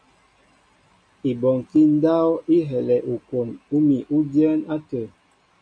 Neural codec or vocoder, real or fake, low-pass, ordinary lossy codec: none; real; 9.9 kHz; MP3, 96 kbps